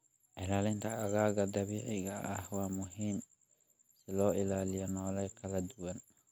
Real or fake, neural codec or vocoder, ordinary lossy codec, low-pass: real; none; none; none